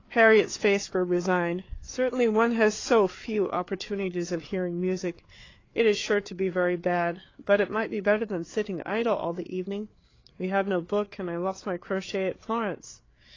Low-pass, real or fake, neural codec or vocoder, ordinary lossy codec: 7.2 kHz; fake; codec, 16 kHz, 4 kbps, FreqCodec, larger model; AAC, 32 kbps